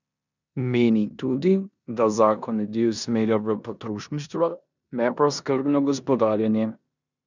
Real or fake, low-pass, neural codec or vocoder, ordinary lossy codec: fake; 7.2 kHz; codec, 16 kHz in and 24 kHz out, 0.9 kbps, LongCat-Audio-Codec, four codebook decoder; none